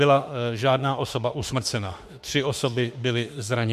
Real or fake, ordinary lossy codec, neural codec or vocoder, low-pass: fake; MP3, 64 kbps; autoencoder, 48 kHz, 32 numbers a frame, DAC-VAE, trained on Japanese speech; 14.4 kHz